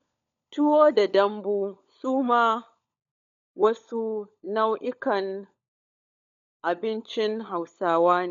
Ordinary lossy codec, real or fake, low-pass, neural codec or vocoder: none; fake; 7.2 kHz; codec, 16 kHz, 16 kbps, FunCodec, trained on LibriTTS, 50 frames a second